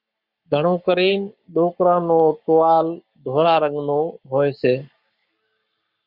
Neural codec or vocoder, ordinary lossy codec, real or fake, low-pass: codec, 44.1 kHz, 7.8 kbps, Pupu-Codec; Opus, 64 kbps; fake; 5.4 kHz